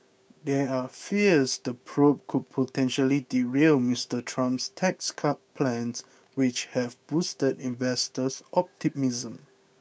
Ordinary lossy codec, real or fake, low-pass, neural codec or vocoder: none; fake; none; codec, 16 kHz, 6 kbps, DAC